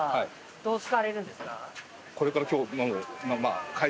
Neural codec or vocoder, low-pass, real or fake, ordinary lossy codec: none; none; real; none